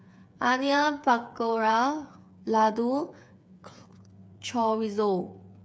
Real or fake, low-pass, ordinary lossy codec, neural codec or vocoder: fake; none; none; codec, 16 kHz, 8 kbps, FreqCodec, smaller model